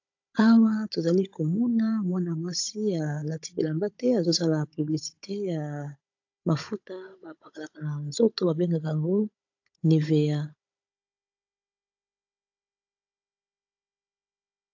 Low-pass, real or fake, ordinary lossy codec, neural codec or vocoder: 7.2 kHz; fake; AAC, 48 kbps; codec, 16 kHz, 16 kbps, FunCodec, trained on Chinese and English, 50 frames a second